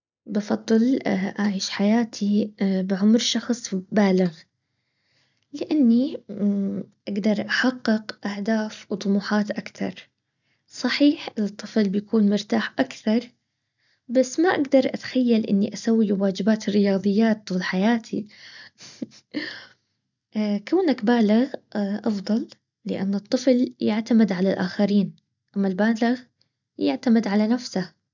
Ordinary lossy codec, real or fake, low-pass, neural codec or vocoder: none; real; 7.2 kHz; none